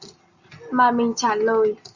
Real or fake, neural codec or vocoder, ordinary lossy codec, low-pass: real; none; Opus, 64 kbps; 7.2 kHz